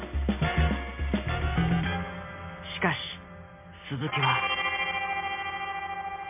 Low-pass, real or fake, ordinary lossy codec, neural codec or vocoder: 3.6 kHz; real; MP3, 24 kbps; none